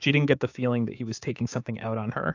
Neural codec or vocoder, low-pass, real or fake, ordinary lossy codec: codec, 16 kHz, 6 kbps, DAC; 7.2 kHz; fake; AAC, 48 kbps